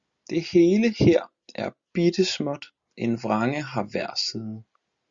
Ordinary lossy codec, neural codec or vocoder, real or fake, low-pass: Opus, 64 kbps; none; real; 7.2 kHz